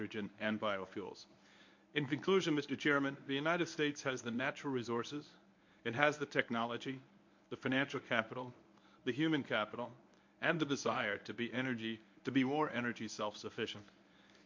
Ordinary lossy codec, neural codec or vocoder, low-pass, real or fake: MP3, 48 kbps; codec, 24 kHz, 0.9 kbps, WavTokenizer, medium speech release version 1; 7.2 kHz; fake